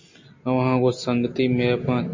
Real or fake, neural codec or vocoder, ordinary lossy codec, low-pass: real; none; MP3, 32 kbps; 7.2 kHz